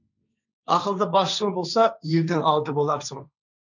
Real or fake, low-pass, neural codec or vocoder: fake; 7.2 kHz; codec, 16 kHz, 1.1 kbps, Voila-Tokenizer